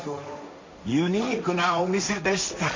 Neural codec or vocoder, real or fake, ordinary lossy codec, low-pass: codec, 16 kHz, 1.1 kbps, Voila-Tokenizer; fake; MP3, 48 kbps; 7.2 kHz